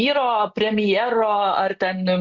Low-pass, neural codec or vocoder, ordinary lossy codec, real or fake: 7.2 kHz; none; Opus, 64 kbps; real